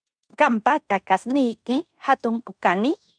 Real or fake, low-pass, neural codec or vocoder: fake; 9.9 kHz; codec, 16 kHz in and 24 kHz out, 0.9 kbps, LongCat-Audio-Codec, fine tuned four codebook decoder